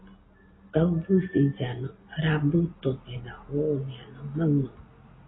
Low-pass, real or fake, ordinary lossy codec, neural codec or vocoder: 7.2 kHz; real; AAC, 16 kbps; none